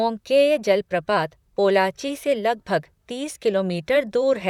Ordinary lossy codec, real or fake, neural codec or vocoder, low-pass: none; fake; vocoder, 44.1 kHz, 128 mel bands, Pupu-Vocoder; 19.8 kHz